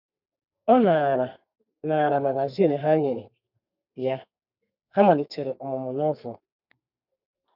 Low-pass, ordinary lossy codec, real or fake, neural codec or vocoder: 5.4 kHz; none; fake; codec, 32 kHz, 1.9 kbps, SNAC